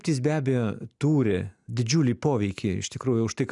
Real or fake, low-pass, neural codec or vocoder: real; 10.8 kHz; none